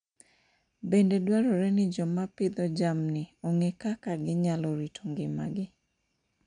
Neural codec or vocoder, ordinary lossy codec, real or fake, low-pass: none; none; real; 9.9 kHz